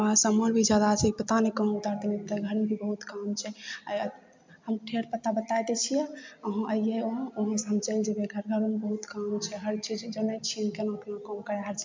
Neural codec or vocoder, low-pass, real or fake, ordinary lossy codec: none; 7.2 kHz; real; MP3, 64 kbps